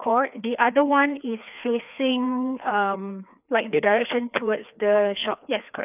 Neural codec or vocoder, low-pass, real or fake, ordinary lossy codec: codec, 16 kHz, 2 kbps, FreqCodec, larger model; 3.6 kHz; fake; none